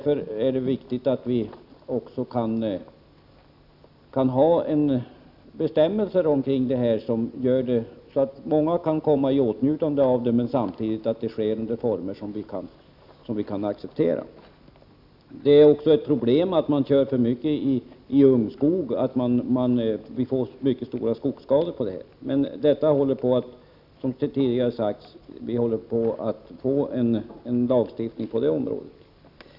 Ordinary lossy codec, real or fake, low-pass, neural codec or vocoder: none; real; 5.4 kHz; none